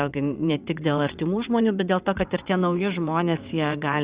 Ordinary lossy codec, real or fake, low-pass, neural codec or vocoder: Opus, 64 kbps; fake; 3.6 kHz; vocoder, 22.05 kHz, 80 mel bands, WaveNeXt